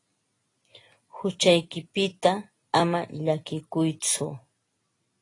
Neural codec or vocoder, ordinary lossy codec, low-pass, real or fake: none; AAC, 32 kbps; 10.8 kHz; real